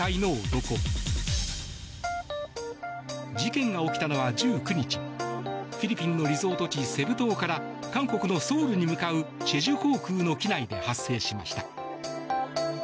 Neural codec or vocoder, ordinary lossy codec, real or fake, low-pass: none; none; real; none